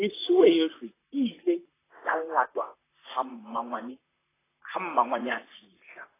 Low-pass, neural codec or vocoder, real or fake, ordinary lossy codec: 3.6 kHz; vocoder, 44.1 kHz, 128 mel bands, Pupu-Vocoder; fake; AAC, 16 kbps